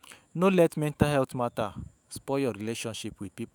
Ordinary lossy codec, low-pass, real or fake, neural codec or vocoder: none; none; fake; autoencoder, 48 kHz, 128 numbers a frame, DAC-VAE, trained on Japanese speech